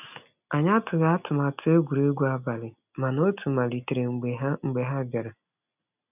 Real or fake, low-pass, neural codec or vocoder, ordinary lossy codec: real; 3.6 kHz; none; none